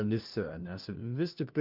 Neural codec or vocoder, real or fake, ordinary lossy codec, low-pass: codec, 16 kHz, 1 kbps, FunCodec, trained on LibriTTS, 50 frames a second; fake; Opus, 32 kbps; 5.4 kHz